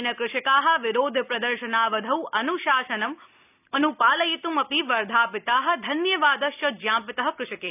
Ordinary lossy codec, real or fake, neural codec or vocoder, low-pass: none; real; none; 3.6 kHz